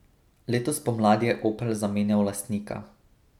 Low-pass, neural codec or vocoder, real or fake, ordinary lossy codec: 19.8 kHz; none; real; none